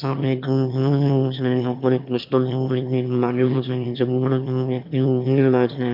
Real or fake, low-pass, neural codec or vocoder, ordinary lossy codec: fake; 5.4 kHz; autoencoder, 22.05 kHz, a latent of 192 numbers a frame, VITS, trained on one speaker; MP3, 48 kbps